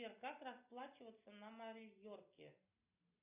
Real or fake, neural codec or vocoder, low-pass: real; none; 3.6 kHz